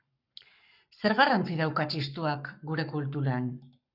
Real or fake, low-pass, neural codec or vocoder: fake; 5.4 kHz; codec, 44.1 kHz, 7.8 kbps, Pupu-Codec